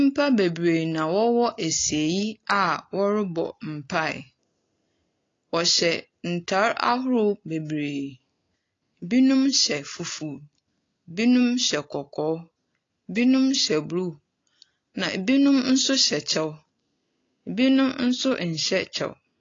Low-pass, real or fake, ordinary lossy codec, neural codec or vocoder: 7.2 kHz; real; AAC, 32 kbps; none